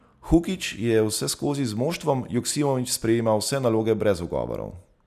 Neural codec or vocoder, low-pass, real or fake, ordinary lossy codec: none; 14.4 kHz; real; none